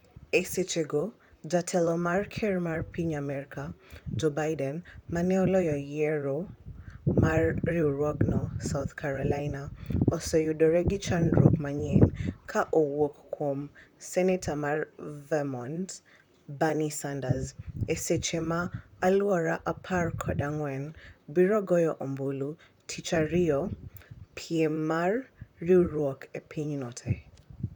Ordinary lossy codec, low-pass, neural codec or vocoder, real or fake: none; 19.8 kHz; vocoder, 44.1 kHz, 128 mel bands, Pupu-Vocoder; fake